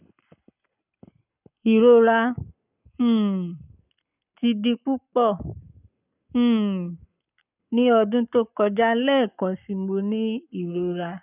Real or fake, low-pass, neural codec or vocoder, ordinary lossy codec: fake; 3.6 kHz; codec, 44.1 kHz, 7.8 kbps, Pupu-Codec; none